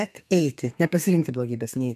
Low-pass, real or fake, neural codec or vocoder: 14.4 kHz; fake; codec, 32 kHz, 1.9 kbps, SNAC